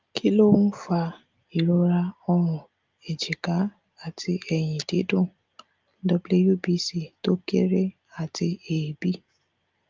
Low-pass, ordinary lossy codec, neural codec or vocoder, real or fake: 7.2 kHz; Opus, 24 kbps; none; real